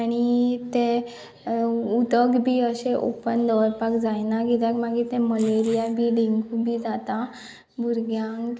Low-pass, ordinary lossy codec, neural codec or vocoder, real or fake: none; none; none; real